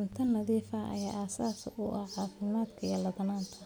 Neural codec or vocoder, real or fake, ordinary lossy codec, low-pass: none; real; none; none